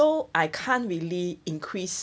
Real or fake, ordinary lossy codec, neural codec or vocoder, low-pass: real; none; none; none